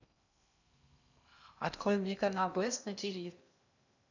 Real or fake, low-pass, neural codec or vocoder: fake; 7.2 kHz; codec, 16 kHz in and 24 kHz out, 0.6 kbps, FocalCodec, streaming, 2048 codes